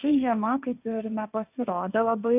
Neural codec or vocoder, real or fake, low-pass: codec, 16 kHz, 1.1 kbps, Voila-Tokenizer; fake; 3.6 kHz